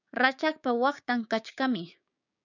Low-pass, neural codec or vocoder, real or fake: 7.2 kHz; codec, 16 kHz, 6 kbps, DAC; fake